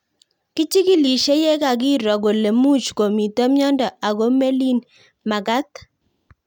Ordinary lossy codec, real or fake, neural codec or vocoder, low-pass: none; real; none; 19.8 kHz